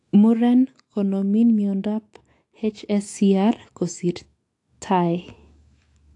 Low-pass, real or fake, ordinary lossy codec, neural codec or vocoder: 10.8 kHz; fake; AAC, 48 kbps; autoencoder, 48 kHz, 128 numbers a frame, DAC-VAE, trained on Japanese speech